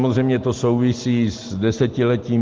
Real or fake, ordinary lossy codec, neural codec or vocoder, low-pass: real; Opus, 16 kbps; none; 7.2 kHz